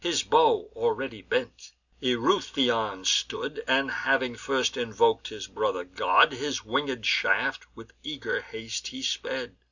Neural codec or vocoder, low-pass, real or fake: none; 7.2 kHz; real